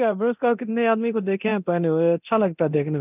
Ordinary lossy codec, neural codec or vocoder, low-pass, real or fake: none; codec, 24 kHz, 0.9 kbps, DualCodec; 3.6 kHz; fake